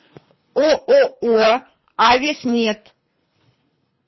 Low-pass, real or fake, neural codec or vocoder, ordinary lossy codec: 7.2 kHz; fake; codec, 24 kHz, 3 kbps, HILCodec; MP3, 24 kbps